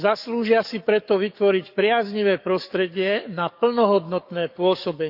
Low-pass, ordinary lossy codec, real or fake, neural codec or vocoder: 5.4 kHz; none; fake; codec, 44.1 kHz, 7.8 kbps, DAC